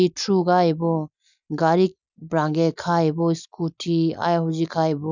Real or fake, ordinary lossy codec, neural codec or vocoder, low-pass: real; none; none; 7.2 kHz